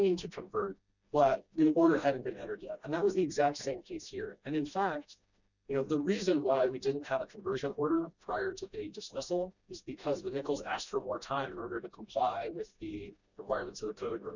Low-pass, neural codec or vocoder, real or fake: 7.2 kHz; codec, 16 kHz, 1 kbps, FreqCodec, smaller model; fake